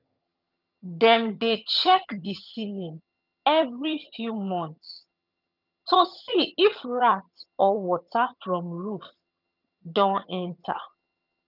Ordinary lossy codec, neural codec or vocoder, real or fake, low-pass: none; vocoder, 22.05 kHz, 80 mel bands, HiFi-GAN; fake; 5.4 kHz